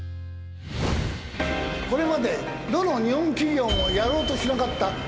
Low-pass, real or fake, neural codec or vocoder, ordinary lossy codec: none; real; none; none